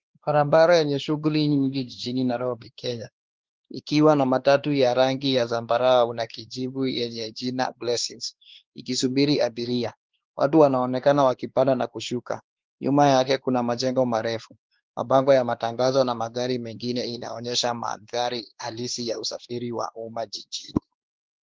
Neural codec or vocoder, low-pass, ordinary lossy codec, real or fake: codec, 16 kHz, 2 kbps, X-Codec, WavLM features, trained on Multilingual LibriSpeech; 7.2 kHz; Opus, 16 kbps; fake